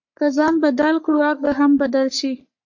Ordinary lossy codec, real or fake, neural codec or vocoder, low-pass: MP3, 48 kbps; fake; codec, 44.1 kHz, 3.4 kbps, Pupu-Codec; 7.2 kHz